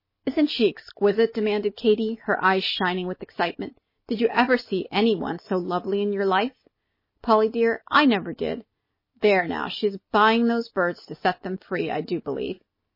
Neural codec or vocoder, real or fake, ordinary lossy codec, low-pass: none; real; MP3, 24 kbps; 5.4 kHz